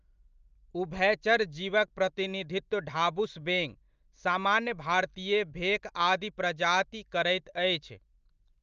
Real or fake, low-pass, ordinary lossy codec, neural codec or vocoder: real; 7.2 kHz; Opus, 24 kbps; none